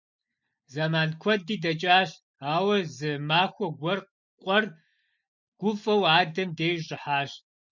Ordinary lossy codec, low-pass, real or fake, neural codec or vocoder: MP3, 64 kbps; 7.2 kHz; real; none